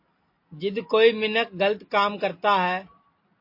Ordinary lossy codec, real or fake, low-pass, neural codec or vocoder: MP3, 32 kbps; real; 5.4 kHz; none